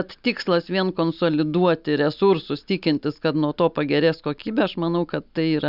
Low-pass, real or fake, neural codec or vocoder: 5.4 kHz; real; none